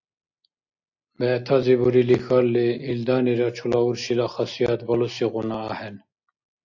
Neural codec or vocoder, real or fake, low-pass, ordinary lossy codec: none; real; 7.2 kHz; AAC, 48 kbps